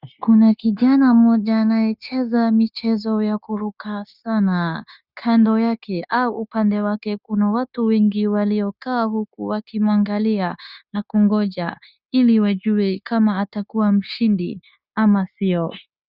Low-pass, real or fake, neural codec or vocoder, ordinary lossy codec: 5.4 kHz; fake; codec, 16 kHz, 0.9 kbps, LongCat-Audio-Codec; Opus, 64 kbps